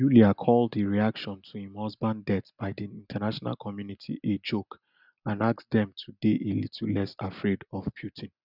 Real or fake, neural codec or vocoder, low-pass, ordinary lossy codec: real; none; 5.4 kHz; none